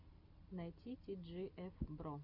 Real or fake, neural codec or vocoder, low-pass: real; none; 5.4 kHz